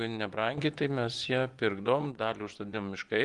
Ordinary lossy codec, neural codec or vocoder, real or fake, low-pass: Opus, 24 kbps; vocoder, 24 kHz, 100 mel bands, Vocos; fake; 10.8 kHz